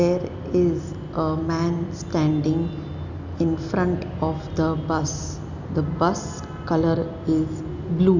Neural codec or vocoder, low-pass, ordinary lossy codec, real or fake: none; 7.2 kHz; none; real